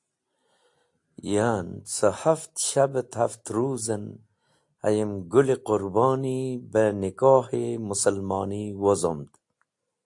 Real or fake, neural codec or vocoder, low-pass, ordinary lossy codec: real; none; 10.8 kHz; AAC, 64 kbps